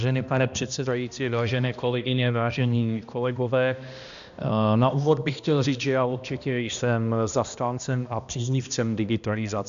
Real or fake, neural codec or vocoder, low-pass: fake; codec, 16 kHz, 1 kbps, X-Codec, HuBERT features, trained on balanced general audio; 7.2 kHz